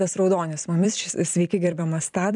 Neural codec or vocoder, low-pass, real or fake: vocoder, 44.1 kHz, 128 mel bands every 256 samples, BigVGAN v2; 10.8 kHz; fake